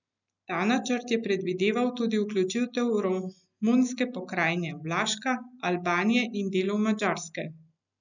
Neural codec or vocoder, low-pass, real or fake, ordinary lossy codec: none; 7.2 kHz; real; none